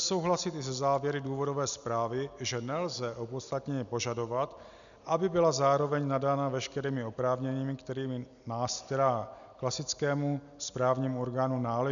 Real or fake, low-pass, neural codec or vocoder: real; 7.2 kHz; none